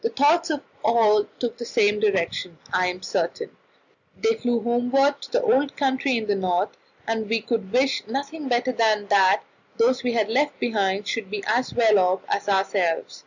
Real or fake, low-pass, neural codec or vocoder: real; 7.2 kHz; none